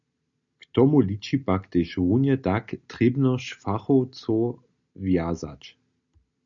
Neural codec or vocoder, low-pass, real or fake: none; 7.2 kHz; real